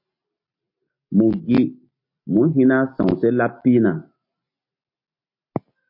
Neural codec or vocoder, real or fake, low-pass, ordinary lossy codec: none; real; 5.4 kHz; MP3, 32 kbps